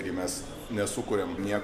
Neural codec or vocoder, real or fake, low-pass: none; real; 14.4 kHz